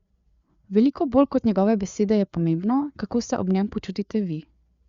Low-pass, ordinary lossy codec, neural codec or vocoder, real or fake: 7.2 kHz; Opus, 64 kbps; codec, 16 kHz, 4 kbps, FreqCodec, larger model; fake